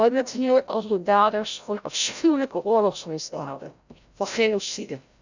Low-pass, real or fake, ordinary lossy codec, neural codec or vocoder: 7.2 kHz; fake; none; codec, 16 kHz, 0.5 kbps, FreqCodec, larger model